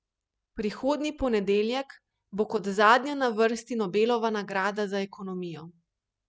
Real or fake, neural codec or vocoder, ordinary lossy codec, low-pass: real; none; none; none